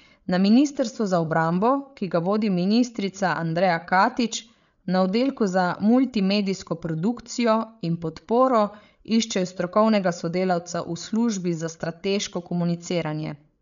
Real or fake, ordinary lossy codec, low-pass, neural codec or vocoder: fake; none; 7.2 kHz; codec, 16 kHz, 8 kbps, FreqCodec, larger model